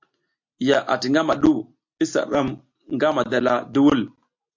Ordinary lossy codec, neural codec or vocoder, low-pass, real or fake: MP3, 48 kbps; none; 7.2 kHz; real